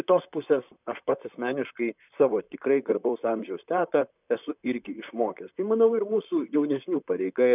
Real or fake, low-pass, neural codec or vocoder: fake; 3.6 kHz; vocoder, 44.1 kHz, 128 mel bands, Pupu-Vocoder